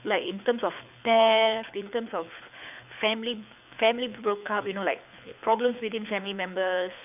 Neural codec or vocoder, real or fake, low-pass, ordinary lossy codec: codec, 24 kHz, 6 kbps, HILCodec; fake; 3.6 kHz; none